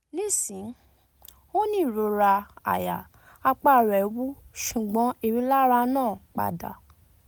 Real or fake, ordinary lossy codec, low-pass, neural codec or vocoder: real; none; none; none